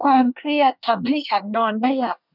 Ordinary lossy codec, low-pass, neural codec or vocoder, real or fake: none; 5.4 kHz; codec, 24 kHz, 1 kbps, SNAC; fake